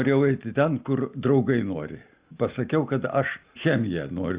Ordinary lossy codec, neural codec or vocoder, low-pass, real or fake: Opus, 64 kbps; none; 3.6 kHz; real